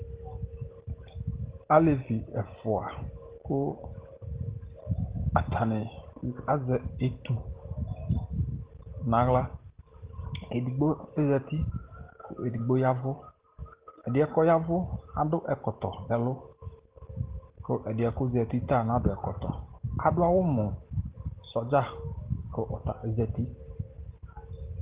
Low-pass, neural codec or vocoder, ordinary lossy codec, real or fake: 3.6 kHz; none; Opus, 16 kbps; real